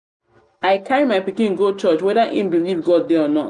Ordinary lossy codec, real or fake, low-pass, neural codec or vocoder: none; real; 10.8 kHz; none